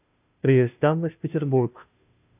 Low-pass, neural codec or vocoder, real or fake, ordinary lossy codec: 3.6 kHz; codec, 16 kHz, 0.5 kbps, FunCodec, trained on Chinese and English, 25 frames a second; fake; AAC, 32 kbps